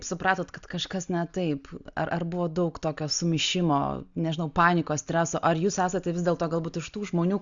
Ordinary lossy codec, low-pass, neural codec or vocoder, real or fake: Opus, 64 kbps; 7.2 kHz; none; real